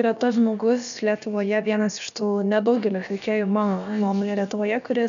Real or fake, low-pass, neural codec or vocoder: fake; 7.2 kHz; codec, 16 kHz, about 1 kbps, DyCAST, with the encoder's durations